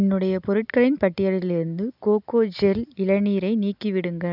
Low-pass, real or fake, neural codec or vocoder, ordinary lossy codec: 5.4 kHz; real; none; none